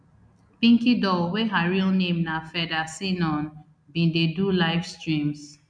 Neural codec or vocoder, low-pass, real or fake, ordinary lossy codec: none; 9.9 kHz; real; none